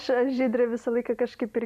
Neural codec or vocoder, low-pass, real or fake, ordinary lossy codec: none; 14.4 kHz; real; MP3, 96 kbps